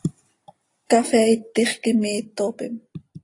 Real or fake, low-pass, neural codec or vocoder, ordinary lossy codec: real; 10.8 kHz; none; AAC, 48 kbps